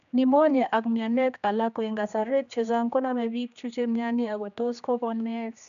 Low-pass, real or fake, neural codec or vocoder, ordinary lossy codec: 7.2 kHz; fake; codec, 16 kHz, 2 kbps, X-Codec, HuBERT features, trained on general audio; none